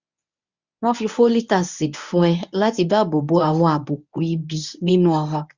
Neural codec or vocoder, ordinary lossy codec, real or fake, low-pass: codec, 24 kHz, 0.9 kbps, WavTokenizer, medium speech release version 1; Opus, 64 kbps; fake; 7.2 kHz